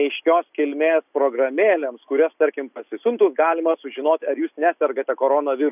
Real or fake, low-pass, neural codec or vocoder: real; 3.6 kHz; none